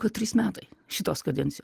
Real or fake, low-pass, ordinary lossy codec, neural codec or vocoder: real; 14.4 kHz; Opus, 24 kbps; none